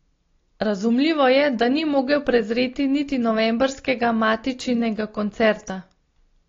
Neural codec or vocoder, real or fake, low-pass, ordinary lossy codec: none; real; 7.2 kHz; AAC, 32 kbps